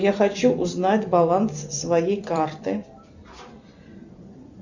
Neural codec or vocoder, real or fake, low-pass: vocoder, 44.1 kHz, 128 mel bands every 256 samples, BigVGAN v2; fake; 7.2 kHz